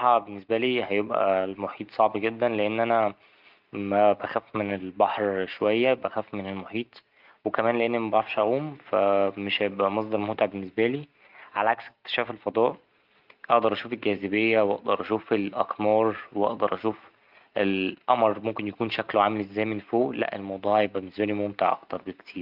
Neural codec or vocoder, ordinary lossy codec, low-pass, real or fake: none; Opus, 32 kbps; 5.4 kHz; real